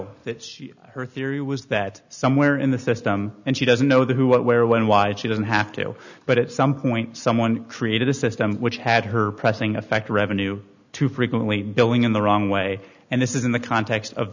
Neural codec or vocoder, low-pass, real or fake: none; 7.2 kHz; real